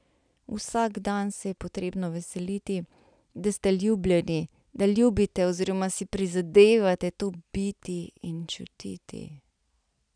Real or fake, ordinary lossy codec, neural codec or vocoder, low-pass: real; none; none; 9.9 kHz